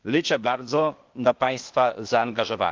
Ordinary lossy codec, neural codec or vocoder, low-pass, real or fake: Opus, 24 kbps; codec, 16 kHz, 0.8 kbps, ZipCodec; 7.2 kHz; fake